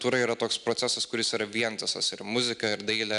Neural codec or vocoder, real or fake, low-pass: none; real; 10.8 kHz